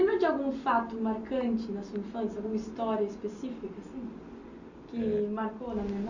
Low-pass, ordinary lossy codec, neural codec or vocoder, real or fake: 7.2 kHz; Opus, 64 kbps; none; real